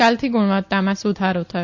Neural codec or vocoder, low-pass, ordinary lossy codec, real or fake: none; 7.2 kHz; Opus, 64 kbps; real